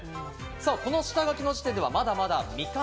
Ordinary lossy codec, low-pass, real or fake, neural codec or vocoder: none; none; real; none